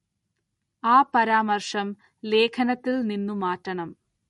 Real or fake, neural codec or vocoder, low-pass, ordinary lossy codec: real; none; 19.8 kHz; MP3, 48 kbps